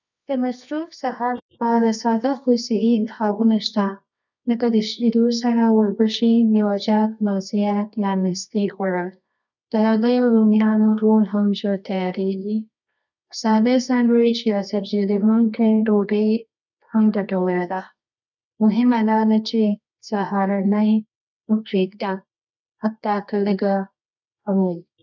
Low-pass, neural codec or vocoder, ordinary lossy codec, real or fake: 7.2 kHz; codec, 24 kHz, 0.9 kbps, WavTokenizer, medium music audio release; none; fake